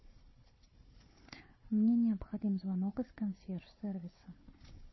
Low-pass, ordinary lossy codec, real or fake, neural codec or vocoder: 7.2 kHz; MP3, 24 kbps; fake; codec, 16 kHz, 4 kbps, FunCodec, trained on Chinese and English, 50 frames a second